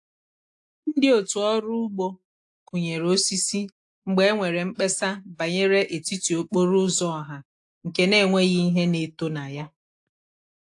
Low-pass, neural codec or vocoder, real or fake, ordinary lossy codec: 10.8 kHz; none; real; AAC, 64 kbps